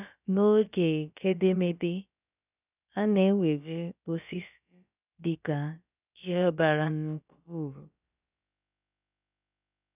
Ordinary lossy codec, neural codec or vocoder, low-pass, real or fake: none; codec, 16 kHz, about 1 kbps, DyCAST, with the encoder's durations; 3.6 kHz; fake